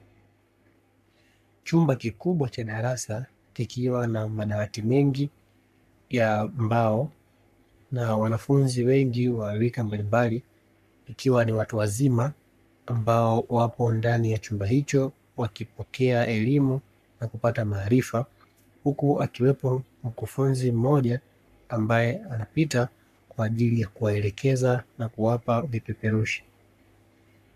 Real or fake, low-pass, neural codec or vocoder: fake; 14.4 kHz; codec, 44.1 kHz, 3.4 kbps, Pupu-Codec